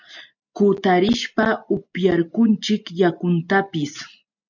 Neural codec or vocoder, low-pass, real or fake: none; 7.2 kHz; real